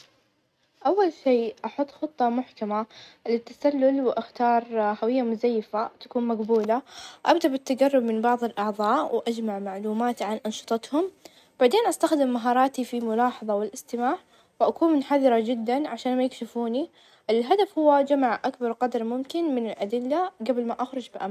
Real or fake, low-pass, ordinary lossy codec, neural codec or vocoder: real; 14.4 kHz; none; none